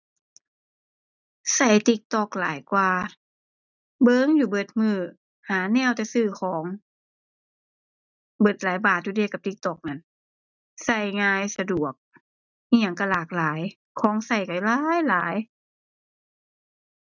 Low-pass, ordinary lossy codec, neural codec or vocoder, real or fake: 7.2 kHz; none; none; real